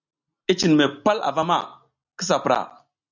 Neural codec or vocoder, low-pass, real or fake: none; 7.2 kHz; real